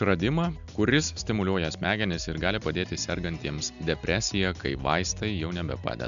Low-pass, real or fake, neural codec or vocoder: 7.2 kHz; real; none